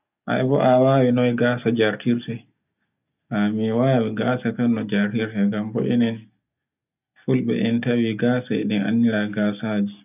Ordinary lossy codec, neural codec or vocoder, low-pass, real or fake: none; none; 3.6 kHz; real